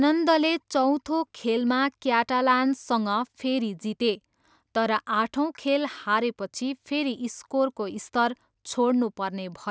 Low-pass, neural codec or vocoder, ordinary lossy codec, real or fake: none; none; none; real